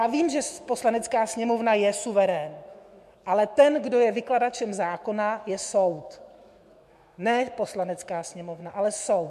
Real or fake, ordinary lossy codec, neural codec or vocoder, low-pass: fake; MP3, 64 kbps; autoencoder, 48 kHz, 128 numbers a frame, DAC-VAE, trained on Japanese speech; 14.4 kHz